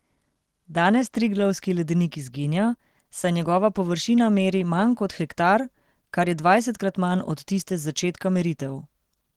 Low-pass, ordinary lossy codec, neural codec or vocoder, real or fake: 19.8 kHz; Opus, 16 kbps; none; real